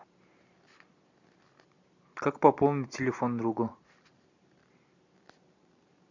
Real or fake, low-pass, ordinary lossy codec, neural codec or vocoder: real; 7.2 kHz; MP3, 64 kbps; none